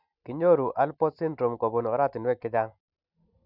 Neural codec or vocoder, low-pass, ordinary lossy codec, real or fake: none; 5.4 kHz; none; real